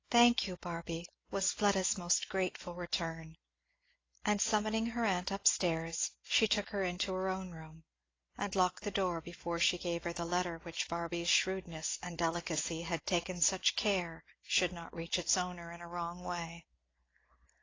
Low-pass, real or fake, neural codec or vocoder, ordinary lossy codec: 7.2 kHz; real; none; AAC, 32 kbps